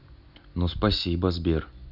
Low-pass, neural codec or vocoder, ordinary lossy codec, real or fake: 5.4 kHz; none; none; real